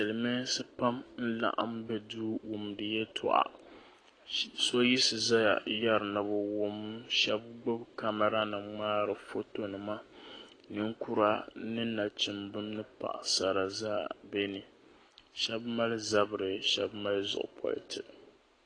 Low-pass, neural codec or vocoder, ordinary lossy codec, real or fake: 9.9 kHz; none; AAC, 32 kbps; real